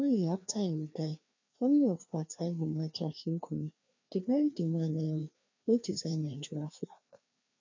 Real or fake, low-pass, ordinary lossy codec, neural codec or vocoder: fake; 7.2 kHz; MP3, 64 kbps; codec, 16 kHz, 2 kbps, FreqCodec, larger model